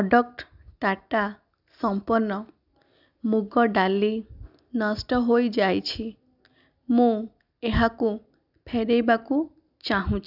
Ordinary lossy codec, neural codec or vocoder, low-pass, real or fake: none; none; 5.4 kHz; real